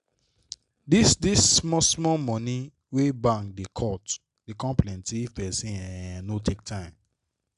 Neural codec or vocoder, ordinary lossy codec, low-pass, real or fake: none; AAC, 96 kbps; 10.8 kHz; real